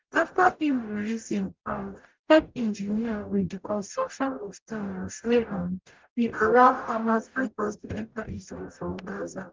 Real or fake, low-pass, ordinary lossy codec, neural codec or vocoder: fake; 7.2 kHz; Opus, 24 kbps; codec, 44.1 kHz, 0.9 kbps, DAC